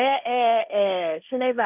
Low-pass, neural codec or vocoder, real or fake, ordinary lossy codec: 3.6 kHz; codec, 16 kHz, 1.1 kbps, Voila-Tokenizer; fake; none